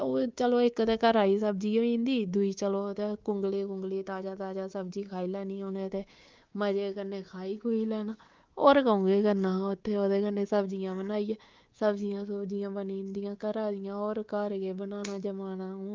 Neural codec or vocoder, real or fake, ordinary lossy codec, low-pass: codec, 24 kHz, 3.1 kbps, DualCodec; fake; Opus, 32 kbps; 7.2 kHz